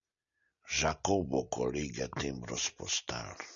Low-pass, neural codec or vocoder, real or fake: 7.2 kHz; none; real